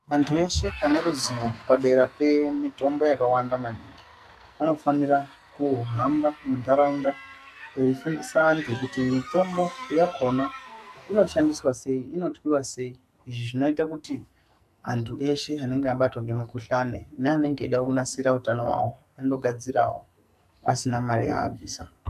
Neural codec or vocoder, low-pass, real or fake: codec, 44.1 kHz, 2.6 kbps, SNAC; 14.4 kHz; fake